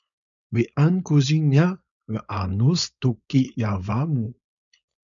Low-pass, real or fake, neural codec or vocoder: 7.2 kHz; fake; codec, 16 kHz, 4.8 kbps, FACodec